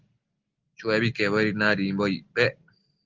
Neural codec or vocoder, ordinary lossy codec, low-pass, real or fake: none; Opus, 16 kbps; 7.2 kHz; real